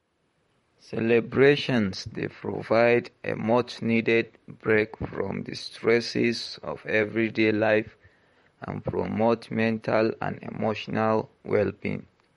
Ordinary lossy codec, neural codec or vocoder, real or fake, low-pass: MP3, 48 kbps; vocoder, 44.1 kHz, 128 mel bands, Pupu-Vocoder; fake; 19.8 kHz